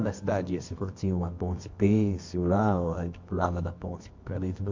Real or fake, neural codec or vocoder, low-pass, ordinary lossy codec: fake; codec, 24 kHz, 0.9 kbps, WavTokenizer, medium music audio release; 7.2 kHz; MP3, 48 kbps